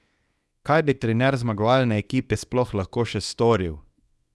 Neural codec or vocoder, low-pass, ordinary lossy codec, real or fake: codec, 24 kHz, 0.9 kbps, WavTokenizer, small release; none; none; fake